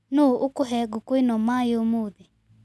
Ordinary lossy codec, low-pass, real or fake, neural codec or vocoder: none; none; real; none